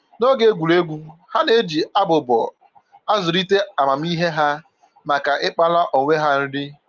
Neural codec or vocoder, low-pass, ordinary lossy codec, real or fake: none; 7.2 kHz; Opus, 24 kbps; real